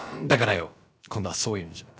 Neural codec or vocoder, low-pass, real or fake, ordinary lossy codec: codec, 16 kHz, about 1 kbps, DyCAST, with the encoder's durations; none; fake; none